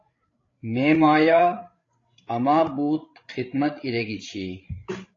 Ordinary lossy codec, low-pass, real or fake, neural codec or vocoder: AAC, 32 kbps; 7.2 kHz; fake; codec, 16 kHz, 16 kbps, FreqCodec, larger model